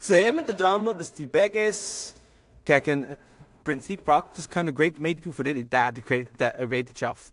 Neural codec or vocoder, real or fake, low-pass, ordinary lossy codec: codec, 16 kHz in and 24 kHz out, 0.4 kbps, LongCat-Audio-Codec, two codebook decoder; fake; 10.8 kHz; AAC, 64 kbps